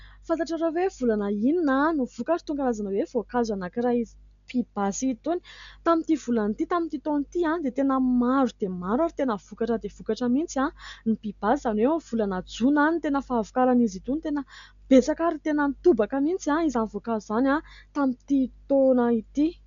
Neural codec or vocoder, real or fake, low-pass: none; real; 7.2 kHz